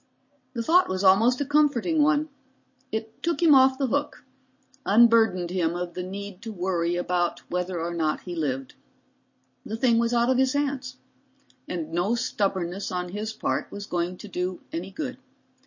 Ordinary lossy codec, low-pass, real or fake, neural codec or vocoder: MP3, 32 kbps; 7.2 kHz; real; none